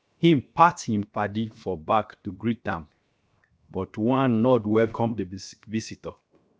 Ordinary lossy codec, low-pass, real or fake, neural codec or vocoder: none; none; fake; codec, 16 kHz, 0.7 kbps, FocalCodec